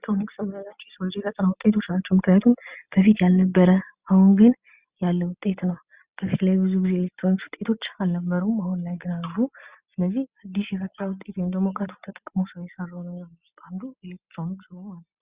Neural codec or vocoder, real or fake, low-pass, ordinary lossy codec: none; real; 3.6 kHz; Opus, 64 kbps